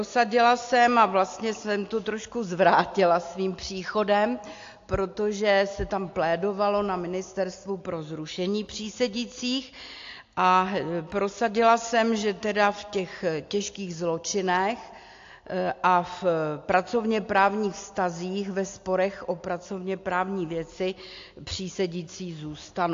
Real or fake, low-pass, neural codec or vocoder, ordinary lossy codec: real; 7.2 kHz; none; AAC, 48 kbps